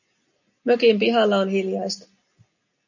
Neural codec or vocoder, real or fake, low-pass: none; real; 7.2 kHz